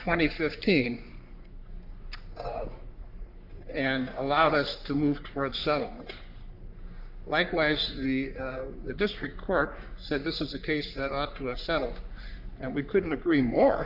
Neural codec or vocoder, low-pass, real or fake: codec, 44.1 kHz, 3.4 kbps, Pupu-Codec; 5.4 kHz; fake